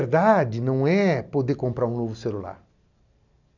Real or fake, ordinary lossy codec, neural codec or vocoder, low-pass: real; none; none; 7.2 kHz